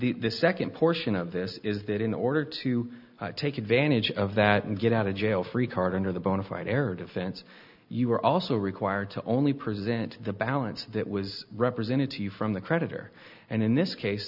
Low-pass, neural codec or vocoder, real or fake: 5.4 kHz; none; real